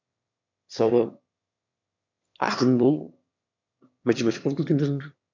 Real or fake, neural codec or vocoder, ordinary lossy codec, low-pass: fake; autoencoder, 22.05 kHz, a latent of 192 numbers a frame, VITS, trained on one speaker; MP3, 64 kbps; 7.2 kHz